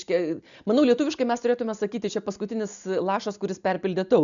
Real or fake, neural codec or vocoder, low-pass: real; none; 7.2 kHz